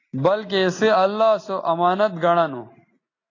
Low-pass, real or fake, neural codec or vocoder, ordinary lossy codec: 7.2 kHz; real; none; AAC, 32 kbps